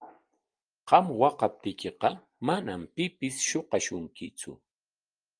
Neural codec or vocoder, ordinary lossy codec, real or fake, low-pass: none; Opus, 32 kbps; real; 9.9 kHz